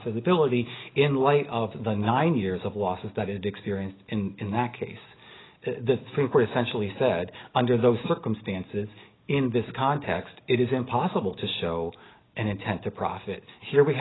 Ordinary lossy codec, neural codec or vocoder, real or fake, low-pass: AAC, 16 kbps; none; real; 7.2 kHz